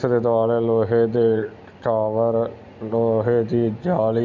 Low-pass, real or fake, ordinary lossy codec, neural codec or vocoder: 7.2 kHz; real; none; none